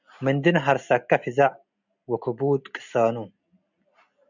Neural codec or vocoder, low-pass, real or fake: none; 7.2 kHz; real